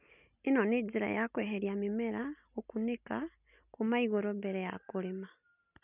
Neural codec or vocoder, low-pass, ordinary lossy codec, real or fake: none; 3.6 kHz; none; real